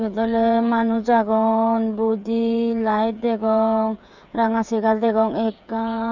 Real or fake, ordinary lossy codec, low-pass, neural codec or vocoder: fake; none; 7.2 kHz; codec, 16 kHz, 8 kbps, FreqCodec, smaller model